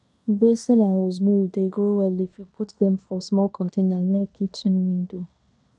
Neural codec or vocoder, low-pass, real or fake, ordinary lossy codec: codec, 16 kHz in and 24 kHz out, 0.9 kbps, LongCat-Audio-Codec, fine tuned four codebook decoder; 10.8 kHz; fake; none